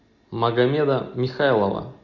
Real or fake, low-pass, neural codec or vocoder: real; 7.2 kHz; none